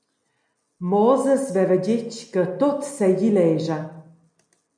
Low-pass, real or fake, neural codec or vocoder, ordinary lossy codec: 9.9 kHz; real; none; MP3, 96 kbps